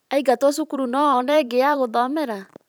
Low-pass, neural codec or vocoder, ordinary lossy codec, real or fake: none; vocoder, 44.1 kHz, 128 mel bands every 512 samples, BigVGAN v2; none; fake